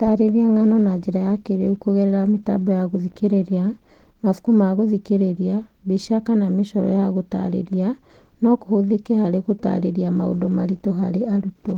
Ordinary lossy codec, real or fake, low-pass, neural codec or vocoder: Opus, 16 kbps; real; 19.8 kHz; none